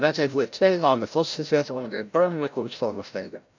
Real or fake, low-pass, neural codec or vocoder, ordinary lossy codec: fake; 7.2 kHz; codec, 16 kHz, 0.5 kbps, FreqCodec, larger model; none